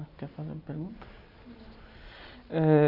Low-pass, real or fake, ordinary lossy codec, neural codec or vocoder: 5.4 kHz; real; none; none